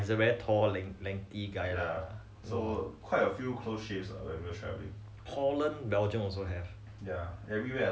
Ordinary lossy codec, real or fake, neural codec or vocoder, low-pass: none; real; none; none